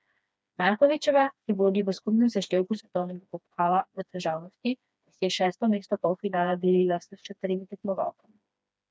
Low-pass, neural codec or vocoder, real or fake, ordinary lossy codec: none; codec, 16 kHz, 2 kbps, FreqCodec, smaller model; fake; none